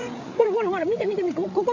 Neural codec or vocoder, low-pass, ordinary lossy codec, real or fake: codec, 16 kHz, 8 kbps, FreqCodec, larger model; 7.2 kHz; MP3, 48 kbps; fake